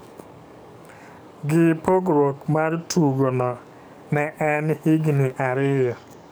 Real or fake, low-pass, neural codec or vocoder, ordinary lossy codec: fake; none; codec, 44.1 kHz, 7.8 kbps, DAC; none